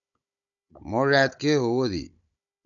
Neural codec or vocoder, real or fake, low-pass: codec, 16 kHz, 16 kbps, FunCodec, trained on Chinese and English, 50 frames a second; fake; 7.2 kHz